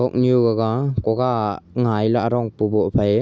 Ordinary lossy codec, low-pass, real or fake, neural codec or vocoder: none; none; real; none